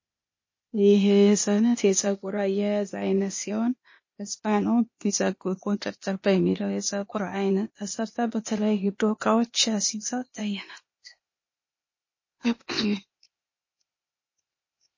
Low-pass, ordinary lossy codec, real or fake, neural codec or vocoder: 7.2 kHz; MP3, 32 kbps; fake; codec, 16 kHz, 0.8 kbps, ZipCodec